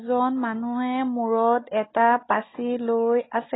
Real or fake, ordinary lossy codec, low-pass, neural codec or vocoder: real; AAC, 16 kbps; 7.2 kHz; none